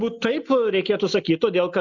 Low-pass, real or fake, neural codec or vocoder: 7.2 kHz; real; none